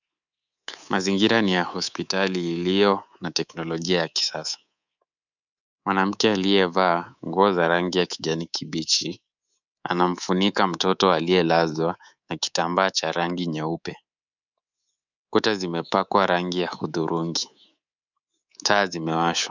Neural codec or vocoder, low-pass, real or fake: codec, 24 kHz, 3.1 kbps, DualCodec; 7.2 kHz; fake